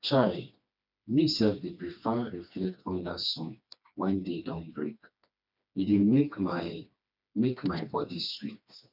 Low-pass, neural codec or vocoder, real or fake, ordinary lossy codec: 5.4 kHz; codec, 16 kHz, 2 kbps, FreqCodec, smaller model; fake; none